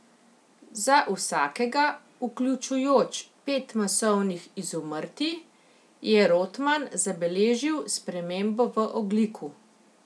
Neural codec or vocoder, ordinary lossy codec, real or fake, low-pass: none; none; real; none